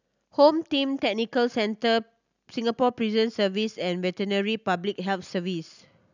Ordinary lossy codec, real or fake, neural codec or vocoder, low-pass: none; real; none; 7.2 kHz